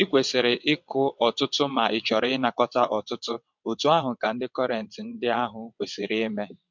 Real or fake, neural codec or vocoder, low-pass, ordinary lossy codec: fake; vocoder, 22.05 kHz, 80 mel bands, WaveNeXt; 7.2 kHz; MP3, 64 kbps